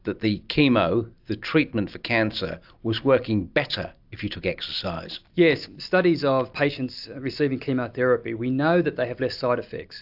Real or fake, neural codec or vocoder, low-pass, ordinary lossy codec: real; none; 5.4 kHz; AAC, 48 kbps